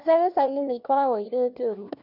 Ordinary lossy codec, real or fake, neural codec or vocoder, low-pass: none; fake; codec, 16 kHz, 1 kbps, FunCodec, trained on LibriTTS, 50 frames a second; 5.4 kHz